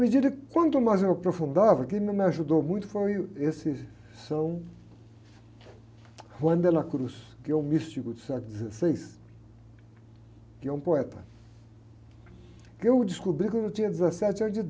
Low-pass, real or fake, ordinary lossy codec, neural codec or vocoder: none; real; none; none